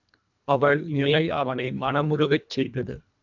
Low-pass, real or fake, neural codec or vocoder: 7.2 kHz; fake; codec, 24 kHz, 1.5 kbps, HILCodec